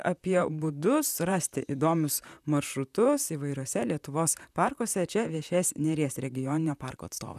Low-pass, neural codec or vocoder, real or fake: 14.4 kHz; vocoder, 44.1 kHz, 128 mel bands, Pupu-Vocoder; fake